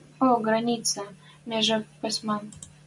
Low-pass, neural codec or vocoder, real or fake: 10.8 kHz; none; real